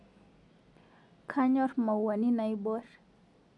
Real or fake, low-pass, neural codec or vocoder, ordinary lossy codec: real; 10.8 kHz; none; none